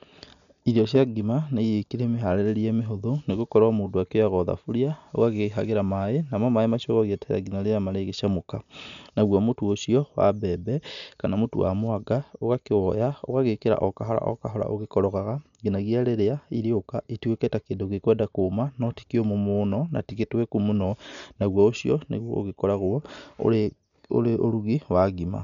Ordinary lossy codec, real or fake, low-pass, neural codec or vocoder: none; real; 7.2 kHz; none